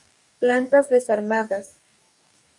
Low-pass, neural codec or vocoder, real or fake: 10.8 kHz; codec, 44.1 kHz, 2.6 kbps, DAC; fake